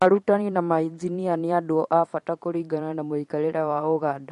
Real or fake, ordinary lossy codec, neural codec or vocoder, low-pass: fake; MP3, 48 kbps; vocoder, 44.1 kHz, 128 mel bands every 512 samples, BigVGAN v2; 14.4 kHz